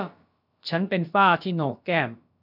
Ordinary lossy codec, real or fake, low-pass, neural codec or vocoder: none; fake; 5.4 kHz; codec, 16 kHz, about 1 kbps, DyCAST, with the encoder's durations